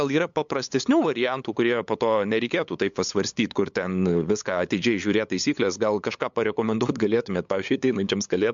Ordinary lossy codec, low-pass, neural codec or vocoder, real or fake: MP3, 64 kbps; 7.2 kHz; codec, 16 kHz, 8 kbps, FunCodec, trained on LibriTTS, 25 frames a second; fake